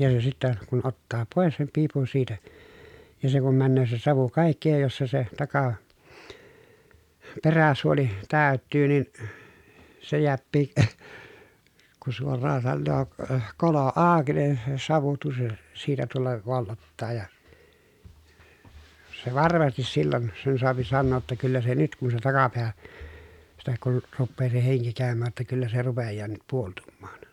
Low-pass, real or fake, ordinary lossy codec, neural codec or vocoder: 19.8 kHz; real; none; none